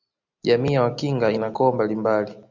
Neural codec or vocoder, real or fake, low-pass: none; real; 7.2 kHz